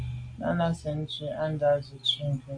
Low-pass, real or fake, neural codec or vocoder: 9.9 kHz; real; none